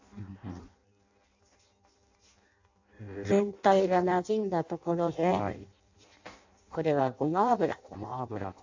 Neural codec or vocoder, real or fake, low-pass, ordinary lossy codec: codec, 16 kHz in and 24 kHz out, 0.6 kbps, FireRedTTS-2 codec; fake; 7.2 kHz; AAC, 48 kbps